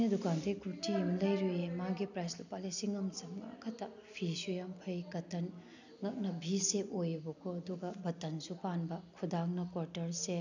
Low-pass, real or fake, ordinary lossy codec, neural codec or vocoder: 7.2 kHz; real; none; none